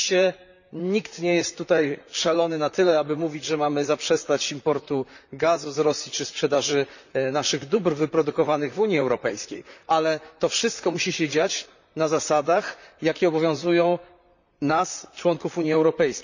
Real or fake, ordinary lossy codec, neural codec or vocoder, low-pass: fake; none; vocoder, 44.1 kHz, 128 mel bands, Pupu-Vocoder; 7.2 kHz